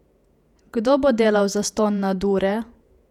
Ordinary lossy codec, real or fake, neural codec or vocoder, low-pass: none; fake; vocoder, 48 kHz, 128 mel bands, Vocos; 19.8 kHz